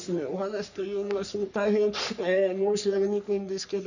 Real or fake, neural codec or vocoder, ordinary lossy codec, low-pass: fake; codec, 44.1 kHz, 3.4 kbps, Pupu-Codec; none; 7.2 kHz